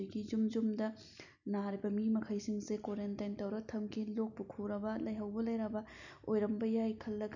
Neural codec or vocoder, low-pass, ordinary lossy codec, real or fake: none; 7.2 kHz; none; real